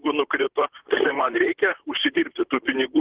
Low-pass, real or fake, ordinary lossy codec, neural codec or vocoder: 3.6 kHz; fake; Opus, 16 kbps; vocoder, 44.1 kHz, 128 mel bands, Pupu-Vocoder